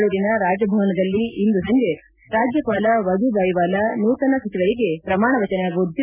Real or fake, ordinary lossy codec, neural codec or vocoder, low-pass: real; none; none; 3.6 kHz